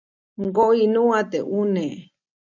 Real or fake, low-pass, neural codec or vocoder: real; 7.2 kHz; none